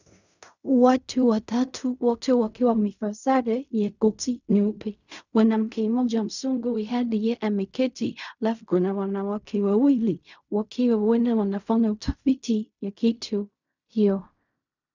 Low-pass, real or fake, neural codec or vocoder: 7.2 kHz; fake; codec, 16 kHz in and 24 kHz out, 0.4 kbps, LongCat-Audio-Codec, fine tuned four codebook decoder